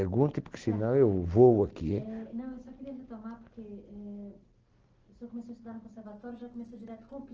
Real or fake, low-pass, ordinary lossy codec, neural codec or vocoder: real; 7.2 kHz; Opus, 16 kbps; none